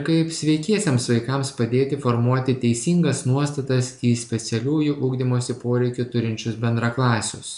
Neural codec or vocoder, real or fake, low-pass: none; real; 10.8 kHz